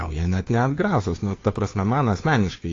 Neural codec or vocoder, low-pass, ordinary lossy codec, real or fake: codec, 16 kHz, 2 kbps, FunCodec, trained on Chinese and English, 25 frames a second; 7.2 kHz; AAC, 32 kbps; fake